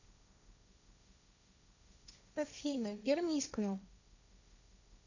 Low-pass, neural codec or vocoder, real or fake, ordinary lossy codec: 7.2 kHz; codec, 16 kHz, 1.1 kbps, Voila-Tokenizer; fake; none